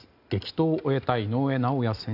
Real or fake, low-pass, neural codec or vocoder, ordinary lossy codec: real; 5.4 kHz; none; none